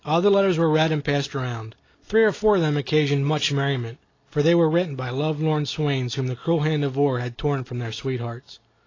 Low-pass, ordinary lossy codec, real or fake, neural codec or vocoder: 7.2 kHz; AAC, 32 kbps; real; none